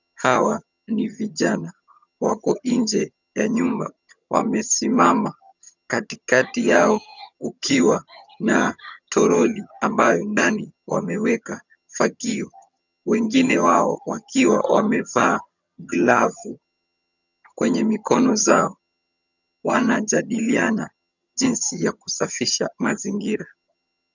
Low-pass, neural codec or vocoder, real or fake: 7.2 kHz; vocoder, 22.05 kHz, 80 mel bands, HiFi-GAN; fake